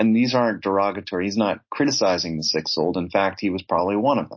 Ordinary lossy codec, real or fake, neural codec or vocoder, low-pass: MP3, 32 kbps; real; none; 7.2 kHz